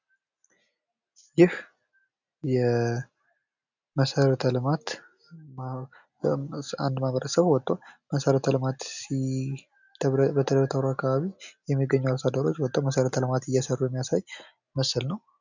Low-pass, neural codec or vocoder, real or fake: 7.2 kHz; none; real